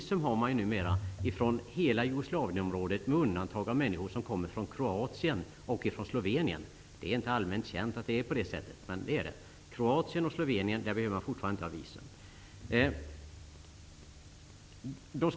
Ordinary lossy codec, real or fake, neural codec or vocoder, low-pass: none; real; none; none